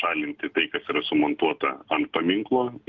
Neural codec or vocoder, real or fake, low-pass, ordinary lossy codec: none; real; 7.2 kHz; Opus, 32 kbps